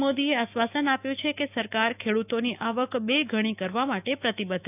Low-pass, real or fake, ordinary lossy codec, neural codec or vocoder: 3.6 kHz; fake; none; autoencoder, 48 kHz, 128 numbers a frame, DAC-VAE, trained on Japanese speech